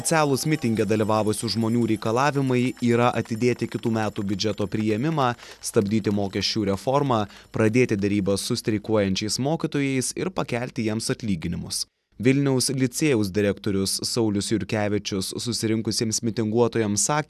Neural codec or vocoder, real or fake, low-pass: none; real; 14.4 kHz